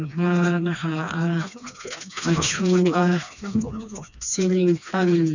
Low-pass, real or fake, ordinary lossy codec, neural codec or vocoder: 7.2 kHz; fake; none; codec, 16 kHz, 2 kbps, FreqCodec, smaller model